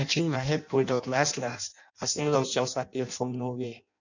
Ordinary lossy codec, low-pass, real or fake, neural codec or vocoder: none; 7.2 kHz; fake; codec, 16 kHz in and 24 kHz out, 0.6 kbps, FireRedTTS-2 codec